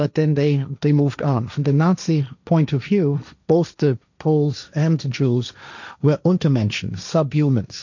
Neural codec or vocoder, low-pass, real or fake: codec, 16 kHz, 1.1 kbps, Voila-Tokenizer; 7.2 kHz; fake